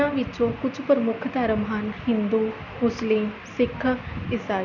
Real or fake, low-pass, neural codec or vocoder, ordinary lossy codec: real; 7.2 kHz; none; none